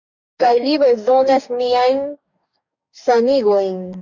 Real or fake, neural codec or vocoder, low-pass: fake; codec, 44.1 kHz, 2.6 kbps, DAC; 7.2 kHz